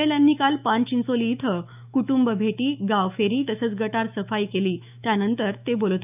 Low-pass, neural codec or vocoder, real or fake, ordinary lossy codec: 3.6 kHz; autoencoder, 48 kHz, 128 numbers a frame, DAC-VAE, trained on Japanese speech; fake; none